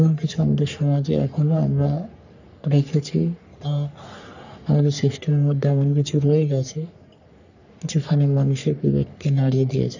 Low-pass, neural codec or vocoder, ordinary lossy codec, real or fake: 7.2 kHz; codec, 44.1 kHz, 3.4 kbps, Pupu-Codec; none; fake